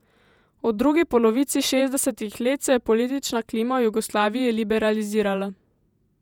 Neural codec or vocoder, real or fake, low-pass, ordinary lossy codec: vocoder, 48 kHz, 128 mel bands, Vocos; fake; 19.8 kHz; none